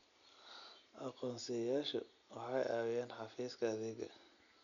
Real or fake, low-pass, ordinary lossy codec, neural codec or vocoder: real; 7.2 kHz; none; none